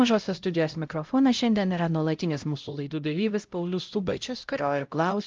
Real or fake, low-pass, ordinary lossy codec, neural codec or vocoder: fake; 7.2 kHz; Opus, 24 kbps; codec, 16 kHz, 0.5 kbps, X-Codec, HuBERT features, trained on LibriSpeech